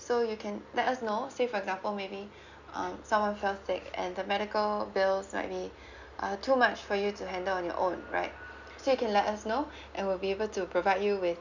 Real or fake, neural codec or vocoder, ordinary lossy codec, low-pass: real; none; none; 7.2 kHz